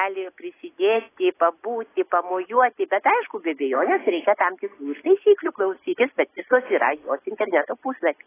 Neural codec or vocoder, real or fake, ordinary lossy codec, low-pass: none; real; AAC, 16 kbps; 3.6 kHz